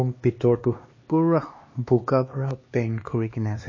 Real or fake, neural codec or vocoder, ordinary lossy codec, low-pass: fake; codec, 16 kHz, 2 kbps, X-Codec, HuBERT features, trained on LibriSpeech; MP3, 32 kbps; 7.2 kHz